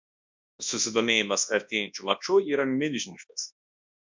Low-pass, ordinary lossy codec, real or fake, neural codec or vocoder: 7.2 kHz; MP3, 64 kbps; fake; codec, 24 kHz, 0.9 kbps, WavTokenizer, large speech release